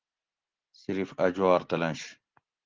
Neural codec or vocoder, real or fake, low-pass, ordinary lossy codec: none; real; 7.2 kHz; Opus, 16 kbps